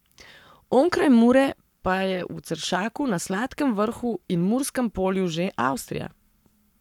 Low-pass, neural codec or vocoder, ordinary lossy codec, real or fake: 19.8 kHz; codec, 44.1 kHz, 7.8 kbps, Pupu-Codec; none; fake